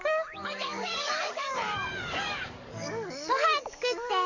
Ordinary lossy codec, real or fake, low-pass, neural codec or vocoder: Opus, 64 kbps; fake; 7.2 kHz; codec, 44.1 kHz, 7.8 kbps, DAC